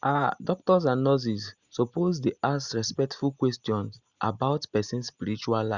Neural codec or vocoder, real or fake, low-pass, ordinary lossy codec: none; real; 7.2 kHz; Opus, 64 kbps